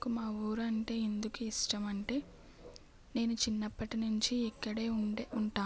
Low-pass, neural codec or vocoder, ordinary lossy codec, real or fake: none; none; none; real